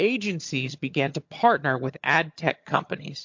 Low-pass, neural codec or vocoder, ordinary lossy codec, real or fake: 7.2 kHz; vocoder, 22.05 kHz, 80 mel bands, HiFi-GAN; MP3, 48 kbps; fake